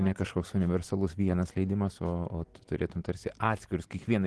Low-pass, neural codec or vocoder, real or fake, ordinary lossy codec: 10.8 kHz; none; real; Opus, 16 kbps